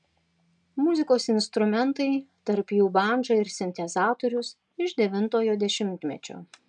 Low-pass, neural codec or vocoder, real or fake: 9.9 kHz; none; real